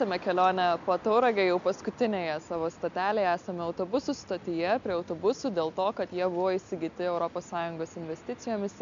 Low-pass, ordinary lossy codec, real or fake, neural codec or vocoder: 7.2 kHz; MP3, 64 kbps; real; none